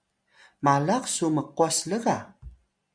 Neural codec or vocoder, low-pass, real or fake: none; 9.9 kHz; real